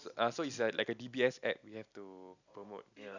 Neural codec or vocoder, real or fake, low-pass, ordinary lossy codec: none; real; 7.2 kHz; none